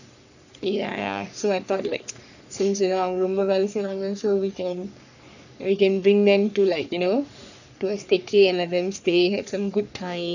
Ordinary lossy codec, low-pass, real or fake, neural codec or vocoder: none; 7.2 kHz; fake; codec, 44.1 kHz, 3.4 kbps, Pupu-Codec